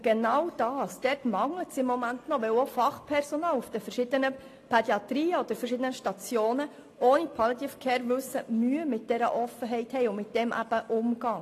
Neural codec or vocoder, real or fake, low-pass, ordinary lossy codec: none; real; 14.4 kHz; AAC, 48 kbps